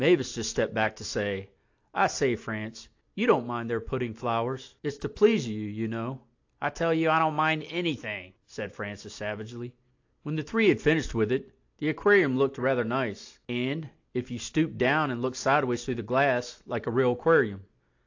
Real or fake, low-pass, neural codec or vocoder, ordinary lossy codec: real; 7.2 kHz; none; AAC, 48 kbps